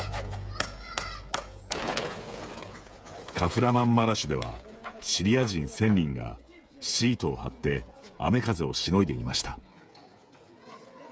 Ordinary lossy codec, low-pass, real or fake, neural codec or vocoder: none; none; fake; codec, 16 kHz, 8 kbps, FreqCodec, smaller model